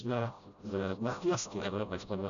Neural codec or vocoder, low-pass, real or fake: codec, 16 kHz, 0.5 kbps, FreqCodec, smaller model; 7.2 kHz; fake